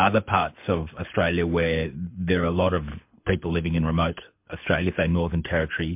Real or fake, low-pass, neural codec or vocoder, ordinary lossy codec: fake; 3.6 kHz; codec, 24 kHz, 6 kbps, HILCodec; MP3, 32 kbps